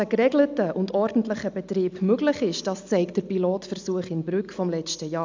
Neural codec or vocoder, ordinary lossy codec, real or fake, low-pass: none; MP3, 64 kbps; real; 7.2 kHz